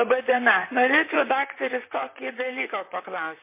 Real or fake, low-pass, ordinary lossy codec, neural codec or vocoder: fake; 3.6 kHz; MP3, 24 kbps; vocoder, 44.1 kHz, 128 mel bands, Pupu-Vocoder